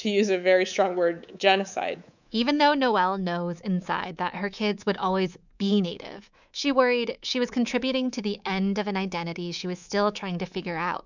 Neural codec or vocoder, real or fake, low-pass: codec, 24 kHz, 3.1 kbps, DualCodec; fake; 7.2 kHz